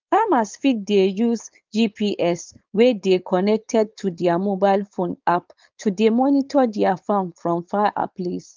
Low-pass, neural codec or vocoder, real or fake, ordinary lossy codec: 7.2 kHz; codec, 16 kHz, 4.8 kbps, FACodec; fake; Opus, 24 kbps